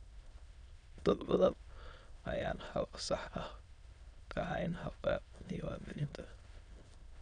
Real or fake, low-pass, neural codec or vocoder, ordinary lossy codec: fake; 9.9 kHz; autoencoder, 22.05 kHz, a latent of 192 numbers a frame, VITS, trained on many speakers; none